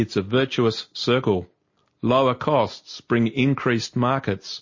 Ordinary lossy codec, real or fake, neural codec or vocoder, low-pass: MP3, 32 kbps; real; none; 7.2 kHz